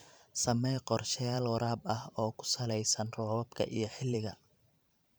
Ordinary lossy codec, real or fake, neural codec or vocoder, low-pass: none; fake; vocoder, 44.1 kHz, 128 mel bands every 512 samples, BigVGAN v2; none